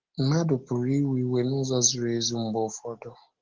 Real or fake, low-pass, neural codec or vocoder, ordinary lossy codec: real; 7.2 kHz; none; Opus, 16 kbps